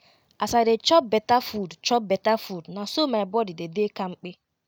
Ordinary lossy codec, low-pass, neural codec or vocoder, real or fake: none; 19.8 kHz; none; real